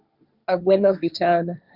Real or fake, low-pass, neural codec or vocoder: fake; 5.4 kHz; codec, 16 kHz, 1.1 kbps, Voila-Tokenizer